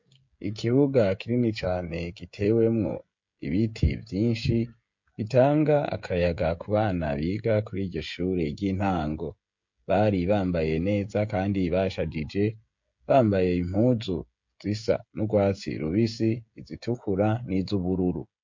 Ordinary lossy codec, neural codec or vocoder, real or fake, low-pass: MP3, 48 kbps; codec, 16 kHz, 16 kbps, FreqCodec, smaller model; fake; 7.2 kHz